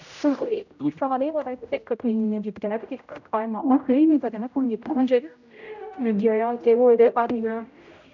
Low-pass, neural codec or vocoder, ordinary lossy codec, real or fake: 7.2 kHz; codec, 16 kHz, 0.5 kbps, X-Codec, HuBERT features, trained on general audio; none; fake